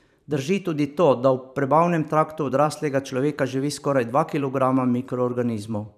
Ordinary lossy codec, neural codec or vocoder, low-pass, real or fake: none; vocoder, 44.1 kHz, 128 mel bands every 512 samples, BigVGAN v2; 14.4 kHz; fake